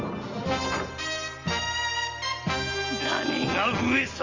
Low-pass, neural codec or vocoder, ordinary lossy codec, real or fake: 7.2 kHz; none; Opus, 32 kbps; real